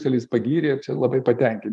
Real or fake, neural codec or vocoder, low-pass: fake; vocoder, 24 kHz, 100 mel bands, Vocos; 10.8 kHz